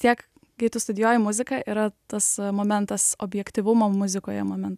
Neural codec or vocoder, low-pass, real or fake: none; 14.4 kHz; real